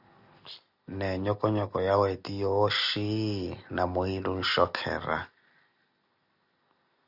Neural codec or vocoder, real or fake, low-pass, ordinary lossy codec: none; real; 5.4 kHz; Opus, 64 kbps